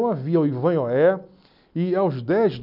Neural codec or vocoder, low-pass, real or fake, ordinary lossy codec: none; 5.4 kHz; real; none